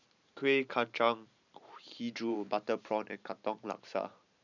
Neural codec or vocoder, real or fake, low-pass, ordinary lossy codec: vocoder, 44.1 kHz, 128 mel bands every 512 samples, BigVGAN v2; fake; 7.2 kHz; none